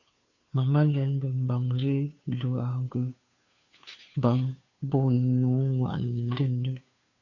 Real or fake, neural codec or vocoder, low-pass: fake; codec, 16 kHz, 2 kbps, FunCodec, trained on Chinese and English, 25 frames a second; 7.2 kHz